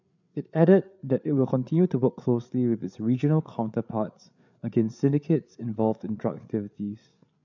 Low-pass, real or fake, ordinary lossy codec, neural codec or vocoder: 7.2 kHz; fake; none; codec, 16 kHz, 8 kbps, FreqCodec, larger model